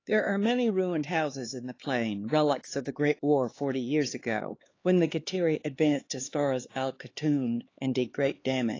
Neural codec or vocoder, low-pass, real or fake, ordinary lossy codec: codec, 16 kHz, 4 kbps, X-Codec, HuBERT features, trained on LibriSpeech; 7.2 kHz; fake; AAC, 32 kbps